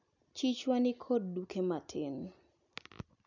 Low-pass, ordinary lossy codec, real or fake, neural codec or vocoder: 7.2 kHz; none; real; none